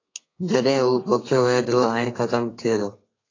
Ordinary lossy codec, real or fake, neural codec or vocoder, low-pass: AAC, 32 kbps; fake; codec, 32 kHz, 1.9 kbps, SNAC; 7.2 kHz